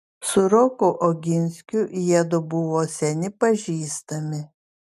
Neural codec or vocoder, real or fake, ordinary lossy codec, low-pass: none; real; MP3, 96 kbps; 14.4 kHz